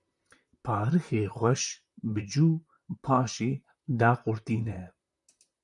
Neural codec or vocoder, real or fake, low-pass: vocoder, 44.1 kHz, 128 mel bands, Pupu-Vocoder; fake; 10.8 kHz